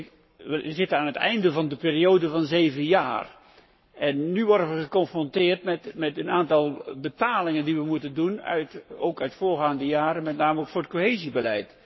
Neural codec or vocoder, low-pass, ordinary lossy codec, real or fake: codec, 44.1 kHz, 7.8 kbps, Pupu-Codec; 7.2 kHz; MP3, 24 kbps; fake